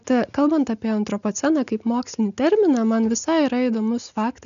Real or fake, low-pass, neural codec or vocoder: real; 7.2 kHz; none